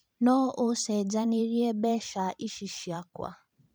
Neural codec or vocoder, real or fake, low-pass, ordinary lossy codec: vocoder, 44.1 kHz, 128 mel bands every 512 samples, BigVGAN v2; fake; none; none